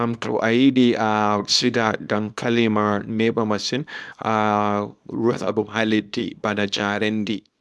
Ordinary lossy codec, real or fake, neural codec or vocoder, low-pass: none; fake; codec, 24 kHz, 0.9 kbps, WavTokenizer, small release; none